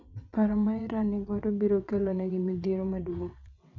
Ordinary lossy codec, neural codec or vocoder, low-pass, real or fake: none; vocoder, 22.05 kHz, 80 mel bands, WaveNeXt; 7.2 kHz; fake